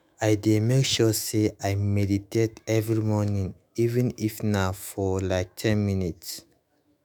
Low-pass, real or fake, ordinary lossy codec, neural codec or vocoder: none; fake; none; autoencoder, 48 kHz, 128 numbers a frame, DAC-VAE, trained on Japanese speech